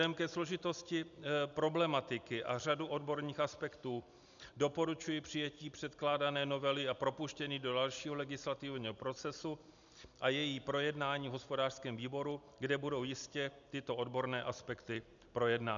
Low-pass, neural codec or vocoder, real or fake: 7.2 kHz; none; real